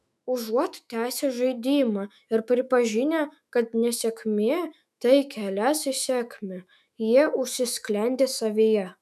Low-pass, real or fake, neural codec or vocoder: 14.4 kHz; fake; autoencoder, 48 kHz, 128 numbers a frame, DAC-VAE, trained on Japanese speech